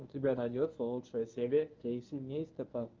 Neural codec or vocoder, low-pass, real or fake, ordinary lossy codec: codec, 24 kHz, 0.9 kbps, WavTokenizer, medium speech release version 1; 7.2 kHz; fake; Opus, 24 kbps